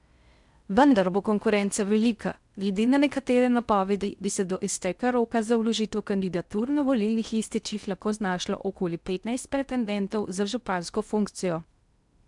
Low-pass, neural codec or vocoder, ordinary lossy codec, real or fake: 10.8 kHz; codec, 16 kHz in and 24 kHz out, 0.6 kbps, FocalCodec, streaming, 4096 codes; none; fake